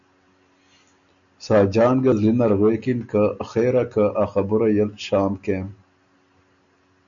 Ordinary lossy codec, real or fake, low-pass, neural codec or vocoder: MP3, 96 kbps; real; 7.2 kHz; none